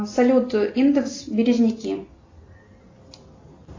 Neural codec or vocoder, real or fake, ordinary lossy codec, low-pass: none; real; AAC, 32 kbps; 7.2 kHz